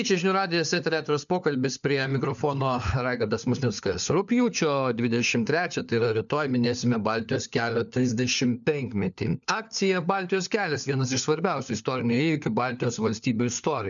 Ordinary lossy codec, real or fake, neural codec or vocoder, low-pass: MP3, 96 kbps; fake; codec, 16 kHz, 4 kbps, FunCodec, trained on LibriTTS, 50 frames a second; 7.2 kHz